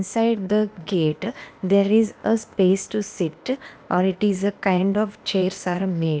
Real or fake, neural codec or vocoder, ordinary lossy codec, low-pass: fake; codec, 16 kHz, 0.8 kbps, ZipCodec; none; none